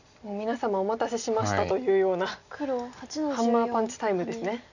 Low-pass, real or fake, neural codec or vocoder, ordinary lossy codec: 7.2 kHz; real; none; none